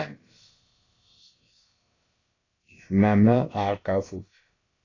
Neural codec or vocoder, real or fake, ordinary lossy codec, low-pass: codec, 16 kHz, about 1 kbps, DyCAST, with the encoder's durations; fake; AAC, 32 kbps; 7.2 kHz